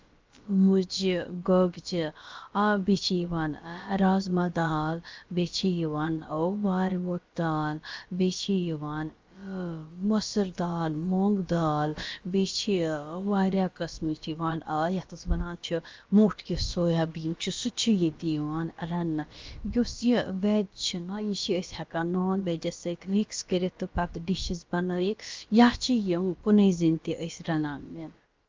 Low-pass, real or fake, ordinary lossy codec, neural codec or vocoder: 7.2 kHz; fake; Opus, 32 kbps; codec, 16 kHz, about 1 kbps, DyCAST, with the encoder's durations